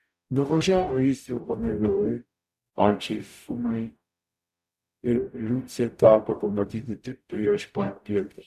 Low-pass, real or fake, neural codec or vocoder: 14.4 kHz; fake; codec, 44.1 kHz, 0.9 kbps, DAC